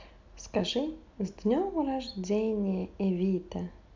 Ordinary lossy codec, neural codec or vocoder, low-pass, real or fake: MP3, 64 kbps; none; 7.2 kHz; real